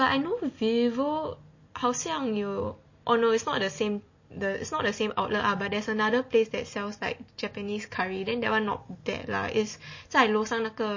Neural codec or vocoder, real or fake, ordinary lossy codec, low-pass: none; real; MP3, 32 kbps; 7.2 kHz